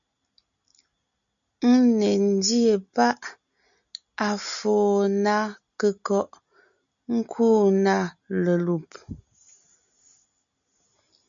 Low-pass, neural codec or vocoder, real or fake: 7.2 kHz; none; real